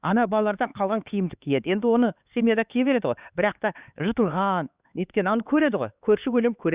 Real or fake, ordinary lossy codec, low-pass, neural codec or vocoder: fake; Opus, 64 kbps; 3.6 kHz; codec, 16 kHz, 4 kbps, X-Codec, HuBERT features, trained on LibriSpeech